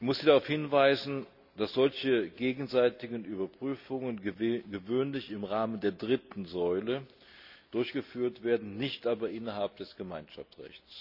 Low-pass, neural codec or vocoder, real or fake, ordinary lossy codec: 5.4 kHz; none; real; none